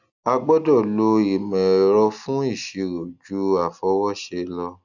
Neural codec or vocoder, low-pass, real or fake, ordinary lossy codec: none; 7.2 kHz; real; none